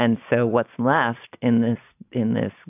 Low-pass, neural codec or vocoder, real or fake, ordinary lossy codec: 3.6 kHz; none; real; AAC, 32 kbps